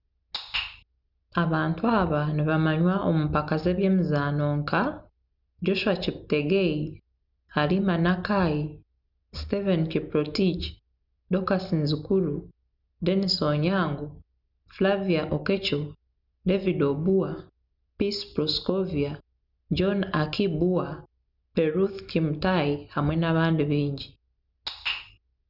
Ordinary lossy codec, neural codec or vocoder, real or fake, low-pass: none; none; real; 5.4 kHz